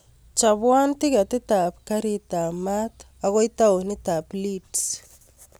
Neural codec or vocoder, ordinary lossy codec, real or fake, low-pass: none; none; real; none